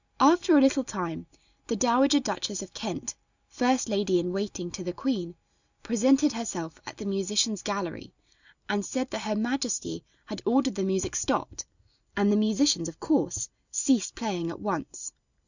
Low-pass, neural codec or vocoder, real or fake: 7.2 kHz; none; real